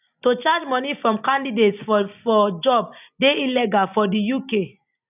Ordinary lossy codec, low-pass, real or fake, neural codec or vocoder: none; 3.6 kHz; real; none